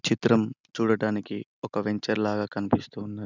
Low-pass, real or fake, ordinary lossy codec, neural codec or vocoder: 7.2 kHz; real; none; none